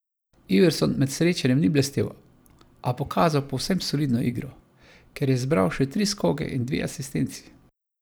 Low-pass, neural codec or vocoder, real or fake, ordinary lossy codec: none; none; real; none